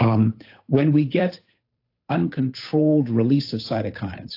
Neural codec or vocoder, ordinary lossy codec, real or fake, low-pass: codec, 16 kHz, 8 kbps, FunCodec, trained on Chinese and English, 25 frames a second; AAC, 32 kbps; fake; 5.4 kHz